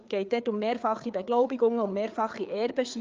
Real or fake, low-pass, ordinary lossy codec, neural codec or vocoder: fake; 7.2 kHz; Opus, 24 kbps; codec, 16 kHz, 4 kbps, FreqCodec, larger model